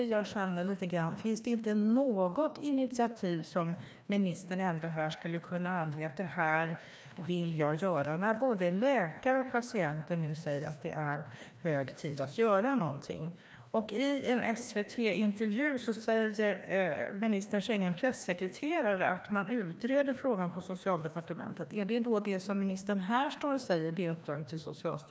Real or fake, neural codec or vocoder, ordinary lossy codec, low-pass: fake; codec, 16 kHz, 1 kbps, FreqCodec, larger model; none; none